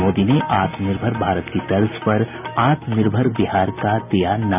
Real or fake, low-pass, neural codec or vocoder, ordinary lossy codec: real; 3.6 kHz; none; none